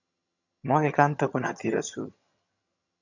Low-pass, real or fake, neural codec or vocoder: 7.2 kHz; fake; vocoder, 22.05 kHz, 80 mel bands, HiFi-GAN